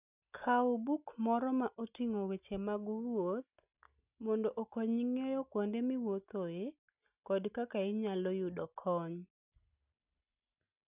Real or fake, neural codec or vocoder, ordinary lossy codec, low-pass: real; none; none; 3.6 kHz